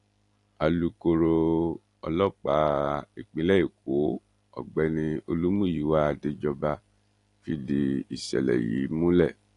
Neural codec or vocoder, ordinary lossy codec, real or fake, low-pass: none; AAC, 48 kbps; real; 10.8 kHz